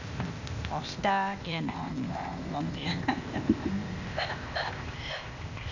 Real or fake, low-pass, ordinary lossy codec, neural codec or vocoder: fake; 7.2 kHz; none; codec, 16 kHz, 0.8 kbps, ZipCodec